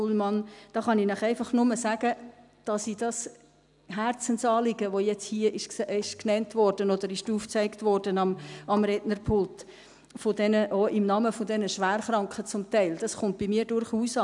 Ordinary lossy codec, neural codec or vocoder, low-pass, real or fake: none; none; 10.8 kHz; real